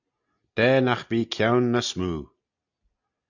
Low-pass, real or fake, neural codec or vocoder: 7.2 kHz; real; none